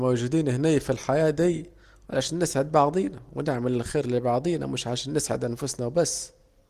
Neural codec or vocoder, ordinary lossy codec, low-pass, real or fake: none; Opus, 24 kbps; 14.4 kHz; real